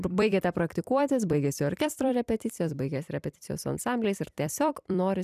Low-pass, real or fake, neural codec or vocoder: 14.4 kHz; fake; vocoder, 44.1 kHz, 128 mel bands every 256 samples, BigVGAN v2